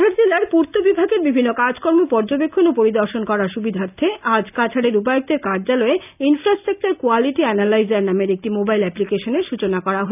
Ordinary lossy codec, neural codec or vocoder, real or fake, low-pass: none; none; real; 3.6 kHz